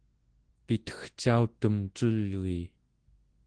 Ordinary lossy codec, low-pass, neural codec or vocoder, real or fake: Opus, 16 kbps; 9.9 kHz; codec, 24 kHz, 0.9 kbps, WavTokenizer, large speech release; fake